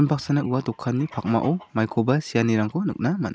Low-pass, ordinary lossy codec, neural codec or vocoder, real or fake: none; none; none; real